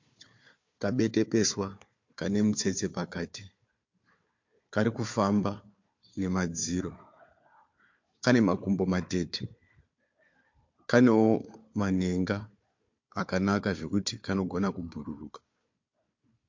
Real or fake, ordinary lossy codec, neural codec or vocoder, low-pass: fake; MP3, 48 kbps; codec, 16 kHz, 4 kbps, FunCodec, trained on Chinese and English, 50 frames a second; 7.2 kHz